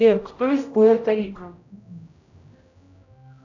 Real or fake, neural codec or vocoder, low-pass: fake; codec, 16 kHz, 0.5 kbps, X-Codec, HuBERT features, trained on general audio; 7.2 kHz